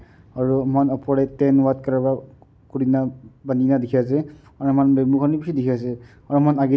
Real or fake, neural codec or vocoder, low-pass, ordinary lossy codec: real; none; none; none